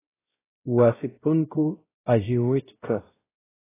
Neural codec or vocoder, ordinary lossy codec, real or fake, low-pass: codec, 16 kHz, 0.5 kbps, X-Codec, WavLM features, trained on Multilingual LibriSpeech; AAC, 16 kbps; fake; 3.6 kHz